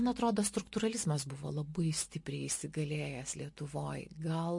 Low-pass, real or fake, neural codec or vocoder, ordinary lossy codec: 10.8 kHz; real; none; MP3, 48 kbps